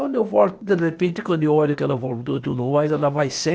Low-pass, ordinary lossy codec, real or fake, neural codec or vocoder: none; none; fake; codec, 16 kHz, 0.8 kbps, ZipCodec